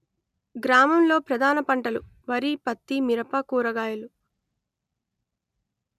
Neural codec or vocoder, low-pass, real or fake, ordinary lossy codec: none; 14.4 kHz; real; none